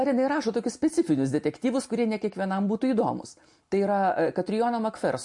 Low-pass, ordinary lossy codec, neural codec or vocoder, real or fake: 10.8 kHz; MP3, 48 kbps; none; real